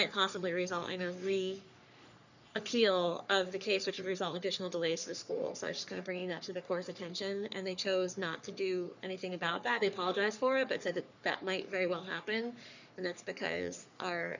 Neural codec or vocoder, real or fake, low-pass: codec, 44.1 kHz, 3.4 kbps, Pupu-Codec; fake; 7.2 kHz